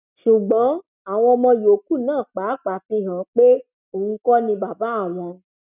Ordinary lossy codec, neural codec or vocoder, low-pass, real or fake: none; none; 3.6 kHz; real